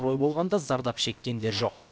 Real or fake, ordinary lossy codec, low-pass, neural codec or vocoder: fake; none; none; codec, 16 kHz, about 1 kbps, DyCAST, with the encoder's durations